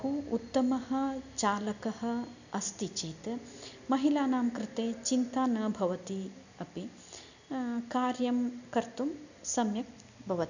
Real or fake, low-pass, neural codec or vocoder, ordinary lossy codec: real; 7.2 kHz; none; none